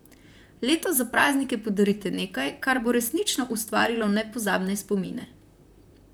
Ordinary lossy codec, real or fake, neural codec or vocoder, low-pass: none; fake; vocoder, 44.1 kHz, 128 mel bands, Pupu-Vocoder; none